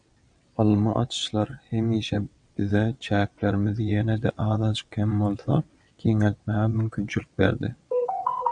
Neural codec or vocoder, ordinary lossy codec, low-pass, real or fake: vocoder, 22.05 kHz, 80 mel bands, WaveNeXt; MP3, 96 kbps; 9.9 kHz; fake